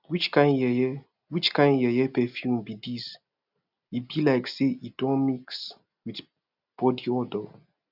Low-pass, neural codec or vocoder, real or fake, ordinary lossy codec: 5.4 kHz; none; real; none